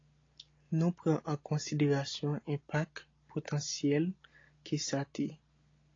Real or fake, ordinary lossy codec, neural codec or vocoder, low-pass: real; AAC, 32 kbps; none; 7.2 kHz